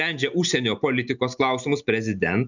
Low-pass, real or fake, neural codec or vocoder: 7.2 kHz; real; none